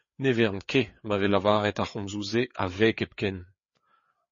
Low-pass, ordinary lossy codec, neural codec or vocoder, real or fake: 7.2 kHz; MP3, 32 kbps; codec, 16 kHz, 4 kbps, FreqCodec, larger model; fake